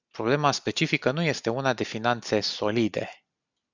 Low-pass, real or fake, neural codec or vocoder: 7.2 kHz; real; none